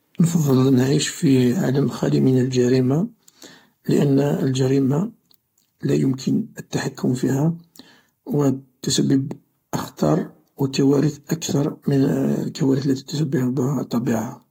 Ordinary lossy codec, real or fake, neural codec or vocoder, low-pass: AAC, 48 kbps; fake; codec, 44.1 kHz, 7.8 kbps, Pupu-Codec; 19.8 kHz